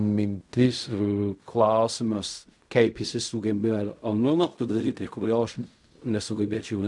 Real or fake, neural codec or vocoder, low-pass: fake; codec, 16 kHz in and 24 kHz out, 0.4 kbps, LongCat-Audio-Codec, fine tuned four codebook decoder; 10.8 kHz